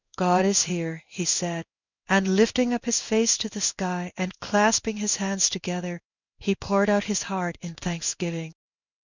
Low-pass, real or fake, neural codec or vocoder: 7.2 kHz; fake; codec, 16 kHz in and 24 kHz out, 1 kbps, XY-Tokenizer